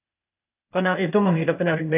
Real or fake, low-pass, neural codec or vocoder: fake; 3.6 kHz; codec, 16 kHz, 0.8 kbps, ZipCodec